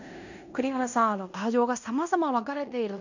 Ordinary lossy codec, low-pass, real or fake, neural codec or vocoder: none; 7.2 kHz; fake; codec, 16 kHz in and 24 kHz out, 0.9 kbps, LongCat-Audio-Codec, fine tuned four codebook decoder